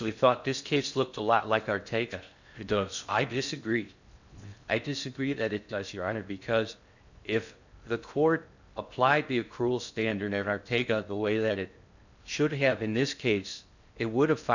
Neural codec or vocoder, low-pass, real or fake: codec, 16 kHz in and 24 kHz out, 0.6 kbps, FocalCodec, streaming, 4096 codes; 7.2 kHz; fake